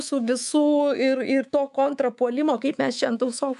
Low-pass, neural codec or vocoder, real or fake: 10.8 kHz; codec, 24 kHz, 3.1 kbps, DualCodec; fake